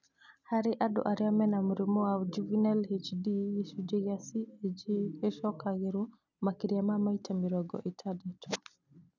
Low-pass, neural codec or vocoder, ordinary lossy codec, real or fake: 7.2 kHz; none; none; real